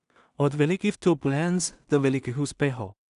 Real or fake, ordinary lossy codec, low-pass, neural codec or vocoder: fake; none; 10.8 kHz; codec, 16 kHz in and 24 kHz out, 0.4 kbps, LongCat-Audio-Codec, two codebook decoder